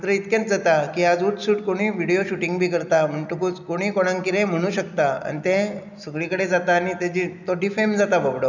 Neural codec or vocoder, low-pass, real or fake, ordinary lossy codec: none; 7.2 kHz; real; none